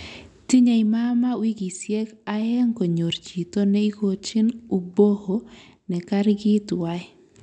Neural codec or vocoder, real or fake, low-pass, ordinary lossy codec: none; real; 10.8 kHz; none